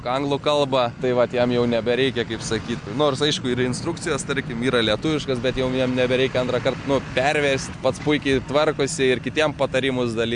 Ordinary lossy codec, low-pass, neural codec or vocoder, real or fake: MP3, 64 kbps; 9.9 kHz; none; real